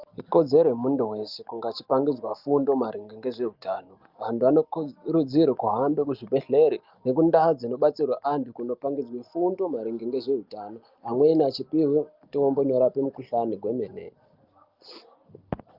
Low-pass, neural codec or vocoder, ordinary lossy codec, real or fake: 5.4 kHz; none; Opus, 24 kbps; real